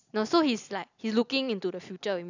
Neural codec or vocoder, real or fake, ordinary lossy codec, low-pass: none; real; none; 7.2 kHz